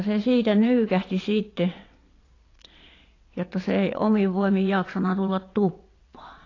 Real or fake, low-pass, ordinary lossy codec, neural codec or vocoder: fake; 7.2 kHz; AAC, 32 kbps; vocoder, 22.05 kHz, 80 mel bands, WaveNeXt